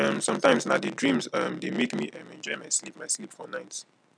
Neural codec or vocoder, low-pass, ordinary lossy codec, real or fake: none; 9.9 kHz; none; real